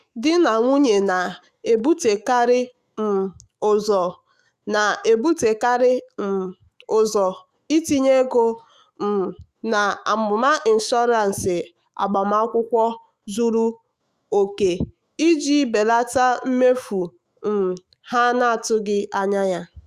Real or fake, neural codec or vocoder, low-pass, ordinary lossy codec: fake; autoencoder, 48 kHz, 128 numbers a frame, DAC-VAE, trained on Japanese speech; 14.4 kHz; Opus, 64 kbps